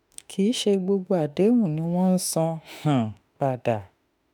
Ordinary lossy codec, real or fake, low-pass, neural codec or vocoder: none; fake; none; autoencoder, 48 kHz, 32 numbers a frame, DAC-VAE, trained on Japanese speech